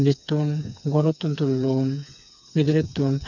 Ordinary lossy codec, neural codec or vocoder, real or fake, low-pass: none; codec, 16 kHz, 4 kbps, FreqCodec, smaller model; fake; 7.2 kHz